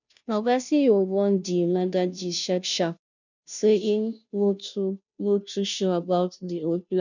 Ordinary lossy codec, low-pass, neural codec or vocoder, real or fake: none; 7.2 kHz; codec, 16 kHz, 0.5 kbps, FunCodec, trained on Chinese and English, 25 frames a second; fake